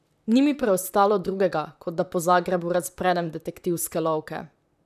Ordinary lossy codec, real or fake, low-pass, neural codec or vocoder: none; fake; 14.4 kHz; vocoder, 44.1 kHz, 128 mel bands, Pupu-Vocoder